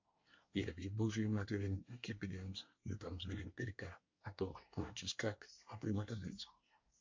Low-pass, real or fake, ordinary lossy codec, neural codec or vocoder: 7.2 kHz; fake; MP3, 48 kbps; codec, 24 kHz, 1 kbps, SNAC